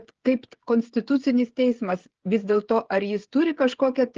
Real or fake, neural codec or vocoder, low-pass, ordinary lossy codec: fake; codec, 16 kHz, 16 kbps, FreqCodec, smaller model; 7.2 kHz; Opus, 16 kbps